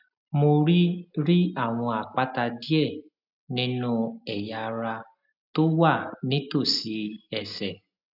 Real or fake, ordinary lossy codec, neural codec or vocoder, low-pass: real; none; none; 5.4 kHz